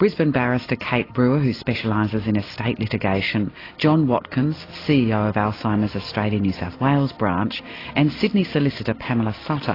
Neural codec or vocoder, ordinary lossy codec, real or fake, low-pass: none; AAC, 24 kbps; real; 5.4 kHz